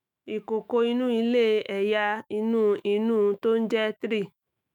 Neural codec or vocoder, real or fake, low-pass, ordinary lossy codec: autoencoder, 48 kHz, 128 numbers a frame, DAC-VAE, trained on Japanese speech; fake; 19.8 kHz; none